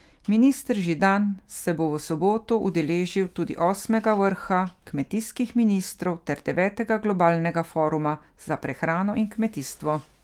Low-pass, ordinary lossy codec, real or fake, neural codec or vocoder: 19.8 kHz; Opus, 24 kbps; fake; autoencoder, 48 kHz, 128 numbers a frame, DAC-VAE, trained on Japanese speech